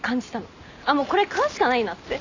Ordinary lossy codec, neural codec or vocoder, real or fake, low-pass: none; none; real; 7.2 kHz